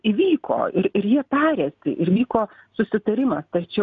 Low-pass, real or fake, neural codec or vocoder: 7.2 kHz; real; none